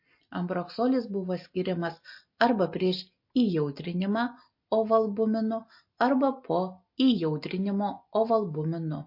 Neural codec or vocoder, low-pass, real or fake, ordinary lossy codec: none; 5.4 kHz; real; MP3, 32 kbps